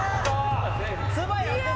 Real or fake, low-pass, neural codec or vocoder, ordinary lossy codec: real; none; none; none